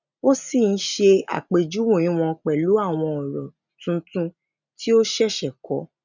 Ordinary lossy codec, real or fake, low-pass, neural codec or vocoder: none; real; 7.2 kHz; none